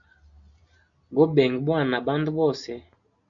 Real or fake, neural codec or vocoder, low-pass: real; none; 7.2 kHz